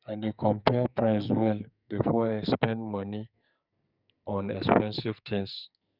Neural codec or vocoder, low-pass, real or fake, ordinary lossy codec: codec, 44.1 kHz, 2.6 kbps, SNAC; 5.4 kHz; fake; none